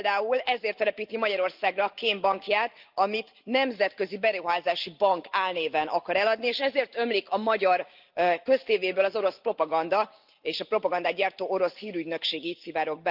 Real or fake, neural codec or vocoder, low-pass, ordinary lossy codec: real; none; 5.4 kHz; Opus, 24 kbps